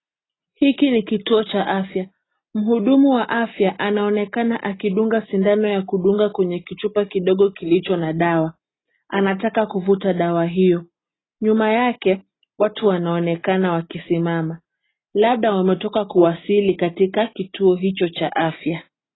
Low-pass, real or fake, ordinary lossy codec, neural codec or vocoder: 7.2 kHz; real; AAC, 16 kbps; none